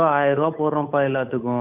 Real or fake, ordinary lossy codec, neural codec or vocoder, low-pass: fake; none; codec, 16 kHz, 8 kbps, FunCodec, trained on Chinese and English, 25 frames a second; 3.6 kHz